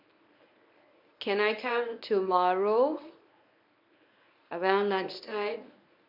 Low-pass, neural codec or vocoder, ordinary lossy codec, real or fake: 5.4 kHz; codec, 24 kHz, 0.9 kbps, WavTokenizer, medium speech release version 1; none; fake